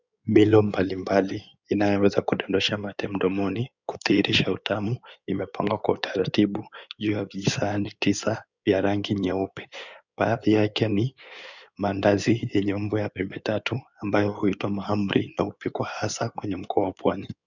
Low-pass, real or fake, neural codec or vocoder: 7.2 kHz; fake; codec, 16 kHz in and 24 kHz out, 2.2 kbps, FireRedTTS-2 codec